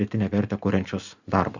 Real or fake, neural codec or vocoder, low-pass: real; none; 7.2 kHz